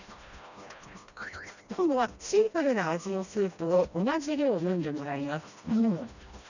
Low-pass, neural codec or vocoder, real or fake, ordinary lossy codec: 7.2 kHz; codec, 16 kHz, 1 kbps, FreqCodec, smaller model; fake; none